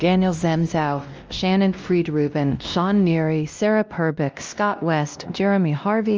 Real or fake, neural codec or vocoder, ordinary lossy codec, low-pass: fake; codec, 16 kHz, 1 kbps, X-Codec, WavLM features, trained on Multilingual LibriSpeech; Opus, 24 kbps; 7.2 kHz